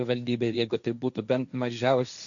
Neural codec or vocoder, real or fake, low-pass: codec, 16 kHz, 1.1 kbps, Voila-Tokenizer; fake; 7.2 kHz